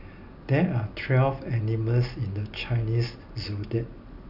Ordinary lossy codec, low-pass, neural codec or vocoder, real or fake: none; 5.4 kHz; none; real